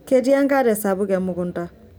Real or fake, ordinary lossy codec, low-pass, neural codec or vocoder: real; none; none; none